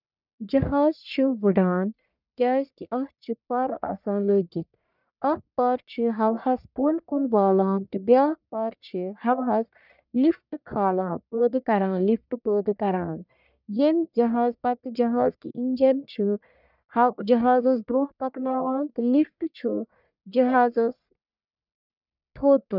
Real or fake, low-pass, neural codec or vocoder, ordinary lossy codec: fake; 5.4 kHz; codec, 44.1 kHz, 1.7 kbps, Pupu-Codec; none